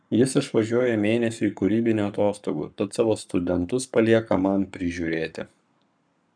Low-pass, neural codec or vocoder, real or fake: 9.9 kHz; codec, 44.1 kHz, 7.8 kbps, Pupu-Codec; fake